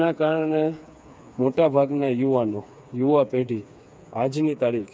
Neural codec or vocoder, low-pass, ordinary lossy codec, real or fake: codec, 16 kHz, 4 kbps, FreqCodec, smaller model; none; none; fake